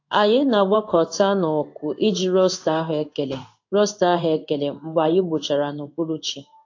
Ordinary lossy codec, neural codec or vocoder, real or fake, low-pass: AAC, 48 kbps; codec, 16 kHz in and 24 kHz out, 1 kbps, XY-Tokenizer; fake; 7.2 kHz